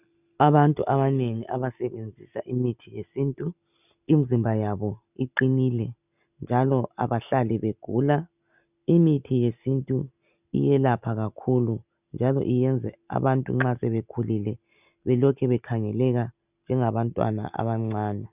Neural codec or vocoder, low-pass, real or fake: none; 3.6 kHz; real